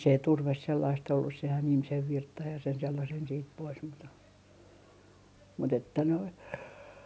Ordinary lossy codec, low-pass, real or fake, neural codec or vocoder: none; none; real; none